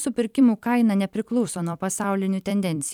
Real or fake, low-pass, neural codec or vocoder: fake; 19.8 kHz; vocoder, 44.1 kHz, 128 mel bands every 256 samples, BigVGAN v2